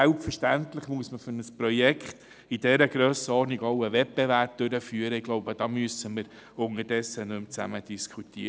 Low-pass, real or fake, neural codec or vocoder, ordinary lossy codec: none; real; none; none